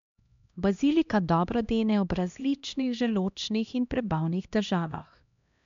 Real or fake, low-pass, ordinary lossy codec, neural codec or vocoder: fake; 7.2 kHz; MP3, 64 kbps; codec, 16 kHz, 1 kbps, X-Codec, HuBERT features, trained on LibriSpeech